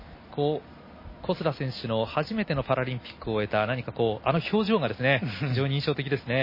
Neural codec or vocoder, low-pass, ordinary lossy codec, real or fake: none; 5.4 kHz; MP3, 24 kbps; real